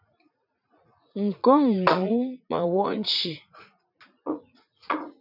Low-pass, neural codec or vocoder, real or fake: 5.4 kHz; vocoder, 44.1 kHz, 80 mel bands, Vocos; fake